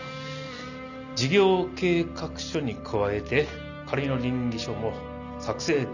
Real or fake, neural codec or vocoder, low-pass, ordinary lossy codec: real; none; 7.2 kHz; none